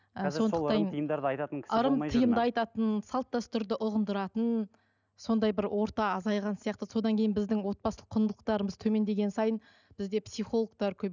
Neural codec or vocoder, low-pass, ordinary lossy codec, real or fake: none; 7.2 kHz; none; real